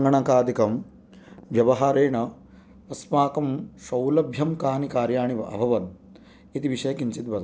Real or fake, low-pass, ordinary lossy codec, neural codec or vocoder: real; none; none; none